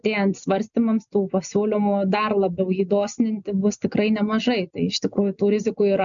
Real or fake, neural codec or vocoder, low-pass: real; none; 7.2 kHz